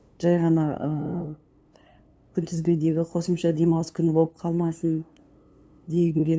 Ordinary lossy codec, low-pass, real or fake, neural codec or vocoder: none; none; fake; codec, 16 kHz, 2 kbps, FunCodec, trained on LibriTTS, 25 frames a second